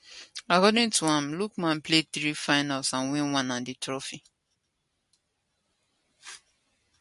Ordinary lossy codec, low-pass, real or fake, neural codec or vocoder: MP3, 48 kbps; 14.4 kHz; real; none